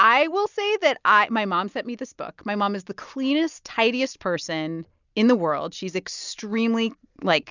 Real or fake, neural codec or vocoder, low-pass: real; none; 7.2 kHz